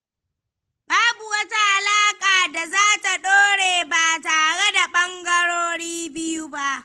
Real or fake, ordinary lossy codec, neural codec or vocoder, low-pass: real; Opus, 16 kbps; none; 10.8 kHz